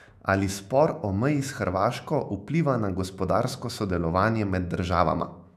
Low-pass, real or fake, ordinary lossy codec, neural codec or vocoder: 14.4 kHz; fake; none; autoencoder, 48 kHz, 128 numbers a frame, DAC-VAE, trained on Japanese speech